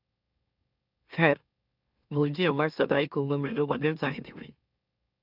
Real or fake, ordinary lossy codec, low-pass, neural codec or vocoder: fake; none; 5.4 kHz; autoencoder, 44.1 kHz, a latent of 192 numbers a frame, MeloTTS